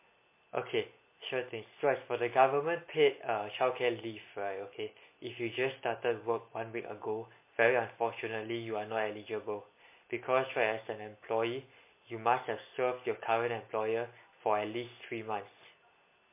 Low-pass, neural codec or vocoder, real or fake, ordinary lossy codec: 3.6 kHz; none; real; MP3, 24 kbps